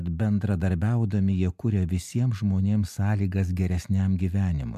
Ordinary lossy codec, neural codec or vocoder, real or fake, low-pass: MP3, 96 kbps; none; real; 14.4 kHz